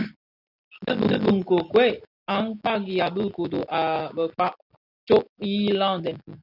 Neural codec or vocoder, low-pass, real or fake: none; 5.4 kHz; real